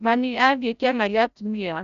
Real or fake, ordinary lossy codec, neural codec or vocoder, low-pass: fake; none; codec, 16 kHz, 0.5 kbps, FreqCodec, larger model; 7.2 kHz